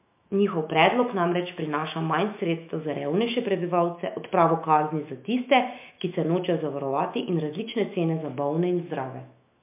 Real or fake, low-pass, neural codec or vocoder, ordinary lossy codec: fake; 3.6 kHz; autoencoder, 48 kHz, 128 numbers a frame, DAC-VAE, trained on Japanese speech; MP3, 32 kbps